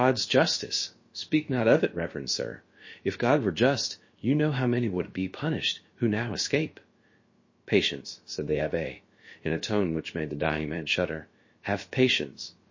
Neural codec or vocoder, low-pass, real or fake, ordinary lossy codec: codec, 16 kHz, about 1 kbps, DyCAST, with the encoder's durations; 7.2 kHz; fake; MP3, 32 kbps